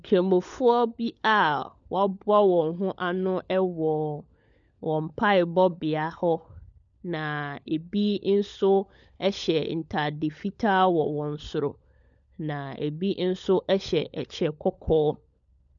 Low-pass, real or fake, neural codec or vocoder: 7.2 kHz; fake; codec, 16 kHz, 16 kbps, FunCodec, trained on LibriTTS, 50 frames a second